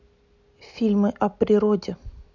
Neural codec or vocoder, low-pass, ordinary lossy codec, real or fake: none; 7.2 kHz; none; real